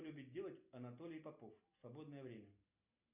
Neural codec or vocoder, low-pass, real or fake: none; 3.6 kHz; real